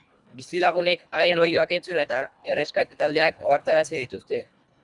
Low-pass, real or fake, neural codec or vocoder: 10.8 kHz; fake; codec, 24 kHz, 1.5 kbps, HILCodec